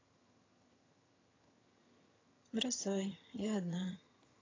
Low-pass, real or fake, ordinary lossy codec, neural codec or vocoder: 7.2 kHz; fake; AAC, 32 kbps; vocoder, 22.05 kHz, 80 mel bands, HiFi-GAN